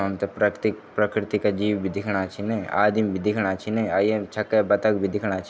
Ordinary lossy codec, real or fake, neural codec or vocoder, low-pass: none; real; none; none